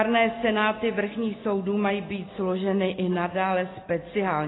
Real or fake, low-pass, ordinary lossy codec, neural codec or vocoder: real; 7.2 kHz; AAC, 16 kbps; none